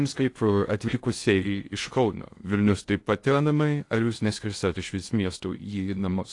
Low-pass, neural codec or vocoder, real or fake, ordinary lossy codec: 10.8 kHz; codec, 16 kHz in and 24 kHz out, 0.6 kbps, FocalCodec, streaming, 2048 codes; fake; AAC, 48 kbps